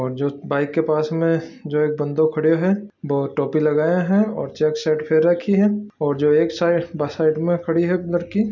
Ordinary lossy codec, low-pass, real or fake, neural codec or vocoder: none; 7.2 kHz; real; none